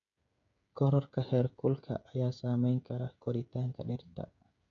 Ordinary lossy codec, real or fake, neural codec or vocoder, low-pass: none; fake; codec, 16 kHz, 16 kbps, FreqCodec, smaller model; 7.2 kHz